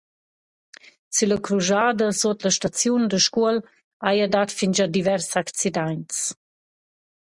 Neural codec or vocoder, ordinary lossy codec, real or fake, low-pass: none; Opus, 64 kbps; real; 10.8 kHz